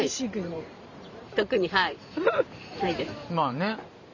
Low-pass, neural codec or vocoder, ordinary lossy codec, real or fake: 7.2 kHz; vocoder, 44.1 kHz, 128 mel bands every 512 samples, BigVGAN v2; none; fake